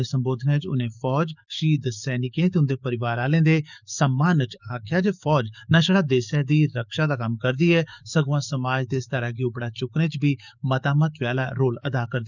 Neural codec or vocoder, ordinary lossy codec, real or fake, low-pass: codec, 44.1 kHz, 7.8 kbps, DAC; none; fake; 7.2 kHz